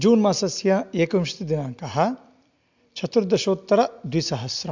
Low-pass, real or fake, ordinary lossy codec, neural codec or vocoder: 7.2 kHz; real; MP3, 64 kbps; none